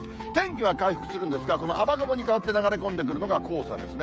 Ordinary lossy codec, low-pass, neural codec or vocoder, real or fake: none; none; codec, 16 kHz, 8 kbps, FreqCodec, smaller model; fake